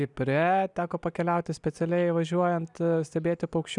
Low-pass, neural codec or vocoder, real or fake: 10.8 kHz; none; real